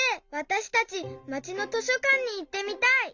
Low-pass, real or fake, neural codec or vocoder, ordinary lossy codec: 7.2 kHz; real; none; Opus, 64 kbps